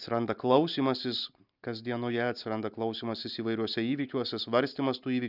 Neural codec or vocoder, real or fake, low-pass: none; real; 5.4 kHz